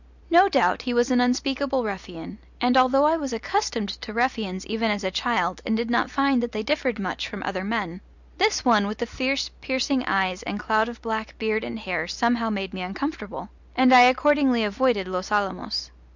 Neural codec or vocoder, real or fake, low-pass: none; real; 7.2 kHz